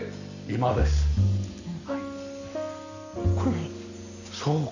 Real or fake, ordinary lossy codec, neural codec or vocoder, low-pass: real; none; none; 7.2 kHz